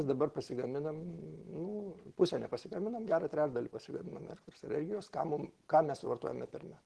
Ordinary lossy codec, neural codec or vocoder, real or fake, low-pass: Opus, 16 kbps; none; real; 10.8 kHz